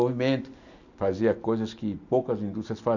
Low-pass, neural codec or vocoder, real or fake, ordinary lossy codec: 7.2 kHz; none; real; none